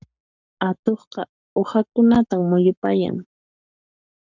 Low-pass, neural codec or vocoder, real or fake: 7.2 kHz; codec, 44.1 kHz, 7.8 kbps, Pupu-Codec; fake